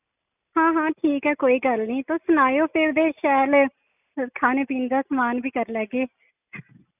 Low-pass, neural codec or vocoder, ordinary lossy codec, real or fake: 3.6 kHz; none; none; real